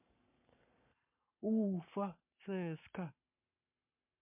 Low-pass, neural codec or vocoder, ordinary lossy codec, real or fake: 3.6 kHz; codec, 44.1 kHz, 7.8 kbps, Pupu-Codec; MP3, 32 kbps; fake